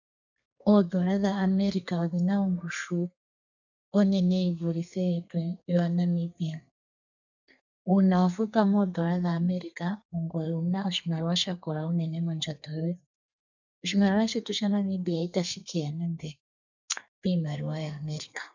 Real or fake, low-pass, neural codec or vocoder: fake; 7.2 kHz; codec, 32 kHz, 1.9 kbps, SNAC